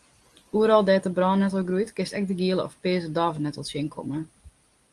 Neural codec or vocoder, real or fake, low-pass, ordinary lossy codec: none; real; 10.8 kHz; Opus, 24 kbps